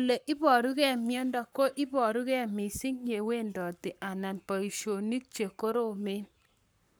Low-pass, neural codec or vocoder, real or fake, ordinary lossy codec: none; codec, 44.1 kHz, 7.8 kbps, Pupu-Codec; fake; none